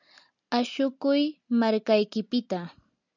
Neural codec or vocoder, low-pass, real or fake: none; 7.2 kHz; real